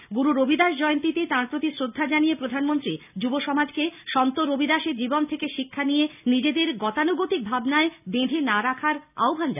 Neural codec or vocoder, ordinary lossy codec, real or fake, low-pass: none; none; real; 3.6 kHz